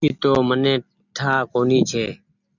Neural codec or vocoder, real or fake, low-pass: none; real; 7.2 kHz